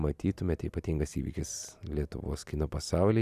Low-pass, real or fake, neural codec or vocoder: 14.4 kHz; real; none